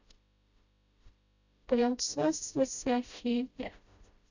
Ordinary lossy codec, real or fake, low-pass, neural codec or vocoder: none; fake; 7.2 kHz; codec, 16 kHz, 0.5 kbps, FreqCodec, smaller model